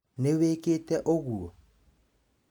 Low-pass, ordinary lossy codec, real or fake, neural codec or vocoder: 19.8 kHz; none; real; none